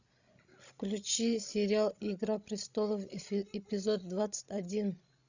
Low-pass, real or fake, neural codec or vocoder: 7.2 kHz; fake; codec, 16 kHz, 16 kbps, FreqCodec, larger model